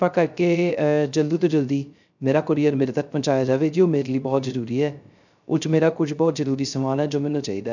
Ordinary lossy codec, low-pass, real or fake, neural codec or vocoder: none; 7.2 kHz; fake; codec, 16 kHz, 0.3 kbps, FocalCodec